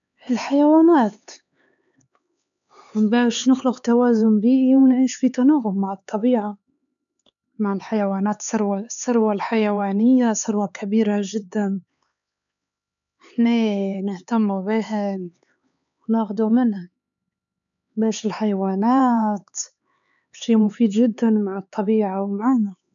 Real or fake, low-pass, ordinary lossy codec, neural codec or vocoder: fake; 7.2 kHz; none; codec, 16 kHz, 4 kbps, X-Codec, HuBERT features, trained on LibriSpeech